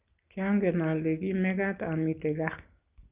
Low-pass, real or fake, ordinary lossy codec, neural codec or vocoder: 3.6 kHz; real; Opus, 24 kbps; none